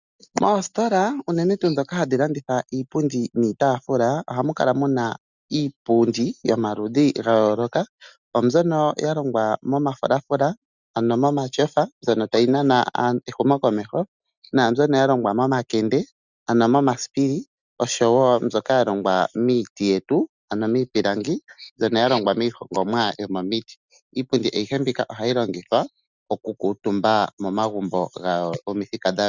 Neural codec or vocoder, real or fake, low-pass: none; real; 7.2 kHz